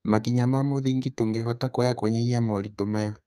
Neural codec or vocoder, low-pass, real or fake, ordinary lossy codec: codec, 32 kHz, 1.9 kbps, SNAC; 14.4 kHz; fake; none